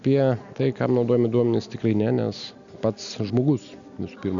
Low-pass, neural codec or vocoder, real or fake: 7.2 kHz; none; real